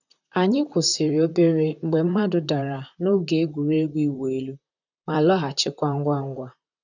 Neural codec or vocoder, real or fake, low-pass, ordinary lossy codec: vocoder, 44.1 kHz, 128 mel bands, Pupu-Vocoder; fake; 7.2 kHz; none